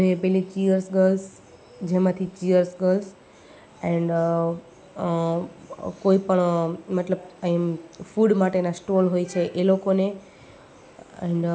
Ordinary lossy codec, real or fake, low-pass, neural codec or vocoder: none; real; none; none